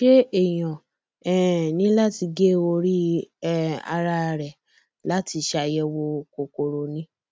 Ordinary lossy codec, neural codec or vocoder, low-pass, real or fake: none; none; none; real